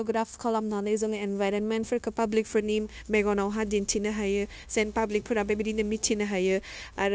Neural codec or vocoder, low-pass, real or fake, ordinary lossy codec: codec, 16 kHz, 0.9 kbps, LongCat-Audio-Codec; none; fake; none